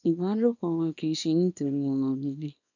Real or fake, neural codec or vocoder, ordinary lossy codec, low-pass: fake; codec, 24 kHz, 0.9 kbps, WavTokenizer, small release; none; 7.2 kHz